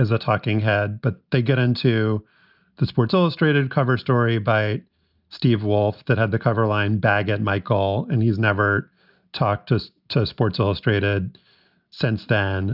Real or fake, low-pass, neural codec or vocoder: real; 5.4 kHz; none